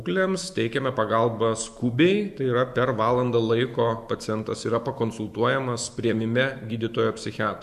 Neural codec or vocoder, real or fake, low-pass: autoencoder, 48 kHz, 128 numbers a frame, DAC-VAE, trained on Japanese speech; fake; 14.4 kHz